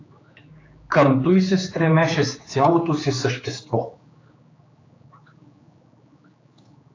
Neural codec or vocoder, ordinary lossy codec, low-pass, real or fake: codec, 16 kHz, 4 kbps, X-Codec, HuBERT features, trained on general audio; AAC, 32 kbps; 7.2 kHz; fake